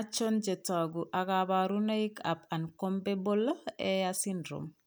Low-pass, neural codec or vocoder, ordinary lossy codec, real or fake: none; none; none; real